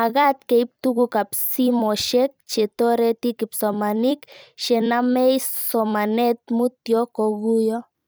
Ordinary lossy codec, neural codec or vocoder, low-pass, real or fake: none; vocoder, 44.1 kHz, 128 mel bands every 256 samples, BigVGAN v2; none; fake